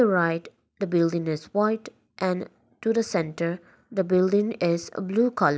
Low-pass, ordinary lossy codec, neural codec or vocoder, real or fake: none; none; none; real